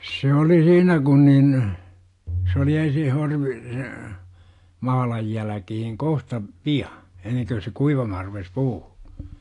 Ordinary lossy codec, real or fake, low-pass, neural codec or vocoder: AAC, 48 kbps; real; 10.8 kHz; none